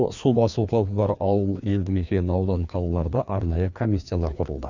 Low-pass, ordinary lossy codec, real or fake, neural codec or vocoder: 7.2 kHz; none; fake; codec, 16 kHz, 2 kbps, FreqCodec, larger model